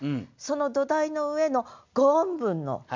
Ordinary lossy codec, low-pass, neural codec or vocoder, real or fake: MP3, 64 kbps; 7.2 kHz; none; real